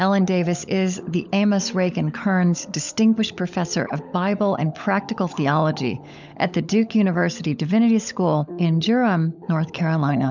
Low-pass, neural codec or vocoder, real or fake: 7.2 kHz; codec, 16 kHz, 16 kbps, FunCodec, trained on LibriTTS, 50 frames a second; fake